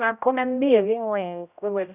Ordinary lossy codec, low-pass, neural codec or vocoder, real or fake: none; 3.6 kHz; codec, 16 kHz, 0.5 kbps, X-Codec, HuBERT features, trained on general audio; fake